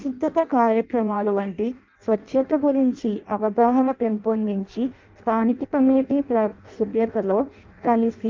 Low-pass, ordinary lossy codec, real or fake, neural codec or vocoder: 7.2 kHz; Opus, 24 kbps; fake; codec, 16 kHz in and 24 kHz out, 0.6 kbps, FireRedTTS-2 codec